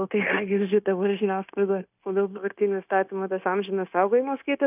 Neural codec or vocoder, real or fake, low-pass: codec, 16 kHz, 0.9 kbps, LongCat-Audio-Codec; fake; 3.6 kHz